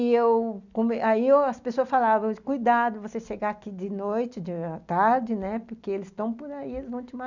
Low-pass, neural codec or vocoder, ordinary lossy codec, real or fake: 7.2 kHz; none; none; real